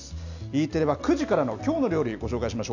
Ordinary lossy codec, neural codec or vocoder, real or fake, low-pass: none; none; real; 7.2 kHz